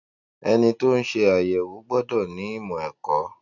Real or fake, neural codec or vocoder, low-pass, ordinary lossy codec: real; none; 7.2 kHz; none